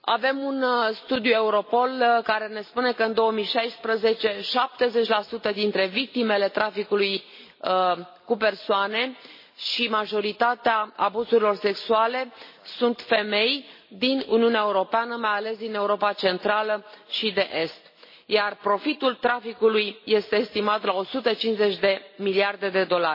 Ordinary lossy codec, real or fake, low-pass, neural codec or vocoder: MP3, 24 kbps; real; 5.4 kHz; none